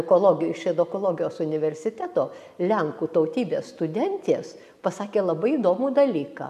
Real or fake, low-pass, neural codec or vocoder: real; 14.4 kHz; none